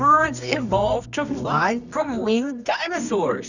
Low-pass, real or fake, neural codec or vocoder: 7.2 kHz; fake; codec, 24 kHz, 0.9 kbps, WavTokenizer, medium music audio release